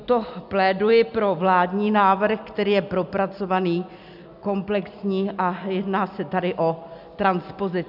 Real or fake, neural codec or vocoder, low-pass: real; none; 5.4 kHz